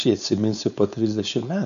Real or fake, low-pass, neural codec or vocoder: fake; 7.2 kHz; codec, 16 kHz, 4.8 kbps, FACodec